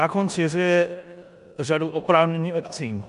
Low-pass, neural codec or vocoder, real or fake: 10.8 kHz; codec, 16 kHz in and 24 kHz out, 0.9 kbps, LongCat-Audio-Codec, four codebook decoder; fake